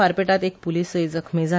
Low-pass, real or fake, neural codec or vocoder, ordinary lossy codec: none; real; none; none